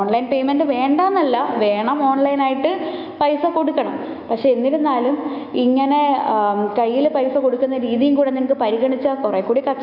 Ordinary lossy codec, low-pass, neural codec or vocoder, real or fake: none; 5.4 kHz; autoencoder, 48 kHz, 128 numbers a frame, DAC-VAE, trained on Japanese speech; fake